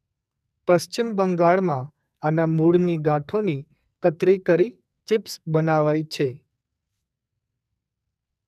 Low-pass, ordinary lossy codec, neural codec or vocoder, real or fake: 14.4 kHz; none; codec, 44.1 kHz, 2.6 kbps, SNAC; fake